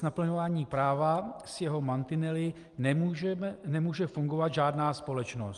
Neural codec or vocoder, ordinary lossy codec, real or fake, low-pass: none; Opus, 32 kbps; real; 10.8 kHz